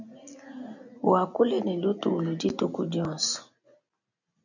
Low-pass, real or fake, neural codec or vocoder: 7.2 kHz; real; none